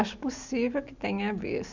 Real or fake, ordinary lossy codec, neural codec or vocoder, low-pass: real; none; none; 7.2 kHz